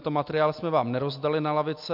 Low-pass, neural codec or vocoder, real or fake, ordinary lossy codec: 5.4 kHz; none; real; MP3, 48 kbps